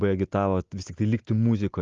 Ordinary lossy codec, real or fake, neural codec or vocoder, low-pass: Opus, 32 kbps; real; none; 7.2 kHz